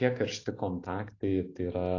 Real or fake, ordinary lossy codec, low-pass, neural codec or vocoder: real; AAC, 32 kbps; 7.2 kHz; none